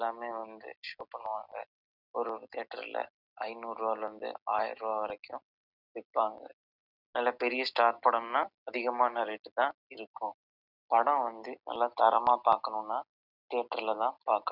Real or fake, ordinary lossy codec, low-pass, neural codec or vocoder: real; none; 5.4 kHz; none